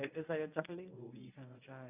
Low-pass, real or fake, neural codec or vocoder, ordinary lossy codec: 3.6 kHz; fake; codec, 24 kHz, 0.9 kbps, WavTokenizer, medium speech release version 1; none